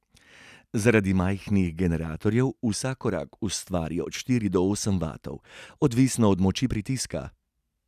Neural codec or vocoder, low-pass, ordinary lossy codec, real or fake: none; 14.4 kHz; none; real